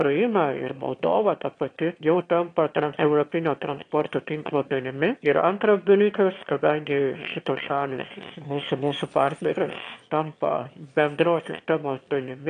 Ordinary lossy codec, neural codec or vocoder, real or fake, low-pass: AAC, 48 kbps; autoencoder, 22.05 kHz, a latent of 192 numbers a frame, VITS, trained on one speaker; fake; 9.9 kHz